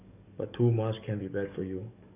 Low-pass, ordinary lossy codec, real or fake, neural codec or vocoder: 3.6 kHz; none; fake; codec, 44.1 kHz, 7.8 kbps, DAC